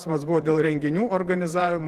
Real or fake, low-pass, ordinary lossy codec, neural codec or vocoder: fake; 14.4 kHz; Opus, 16 kbps; vocoder, 48 kHz, 128 mel bands, Vocos